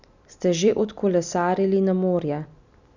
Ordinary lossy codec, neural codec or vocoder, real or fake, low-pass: none; none; real; 7.2 kHz